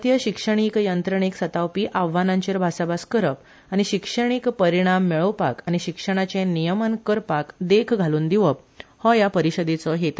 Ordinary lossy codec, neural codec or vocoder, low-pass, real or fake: none; none; none; real